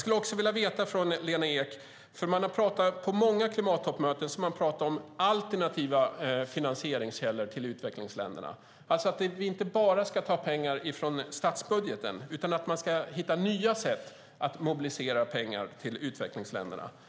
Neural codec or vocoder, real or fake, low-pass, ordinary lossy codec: none; real; none; none